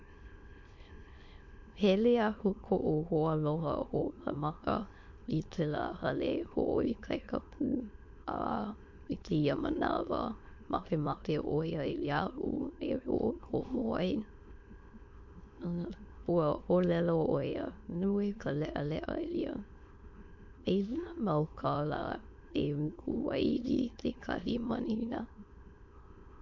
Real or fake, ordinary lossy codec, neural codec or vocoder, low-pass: fake; MP3, 48 kbps; autoencoder, 22.05 kHz, a latent of 192 numbers a frame, VITS, trained on many speakers; 7.2 kHz